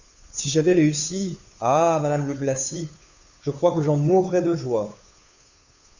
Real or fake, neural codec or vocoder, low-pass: fake; codec, 16 kHz, 2 kbps, FunCodec, trained on Chinese and English, 25 frames a second; 7.2 kHz